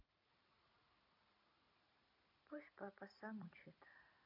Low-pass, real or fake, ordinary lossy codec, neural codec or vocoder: 5.4 kHz; real; MP3, 48 kbps; none